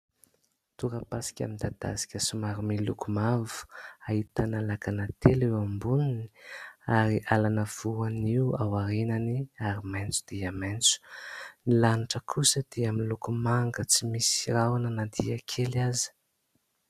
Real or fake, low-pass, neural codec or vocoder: real; 14.4 kHz; none